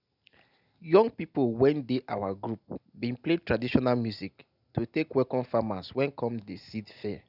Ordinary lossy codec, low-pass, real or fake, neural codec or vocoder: none; 5.4 kHz; real; none